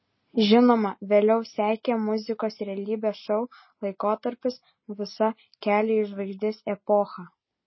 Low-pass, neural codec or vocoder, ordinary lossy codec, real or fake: 7.2 kHz; none; MP3, 24 kbps; real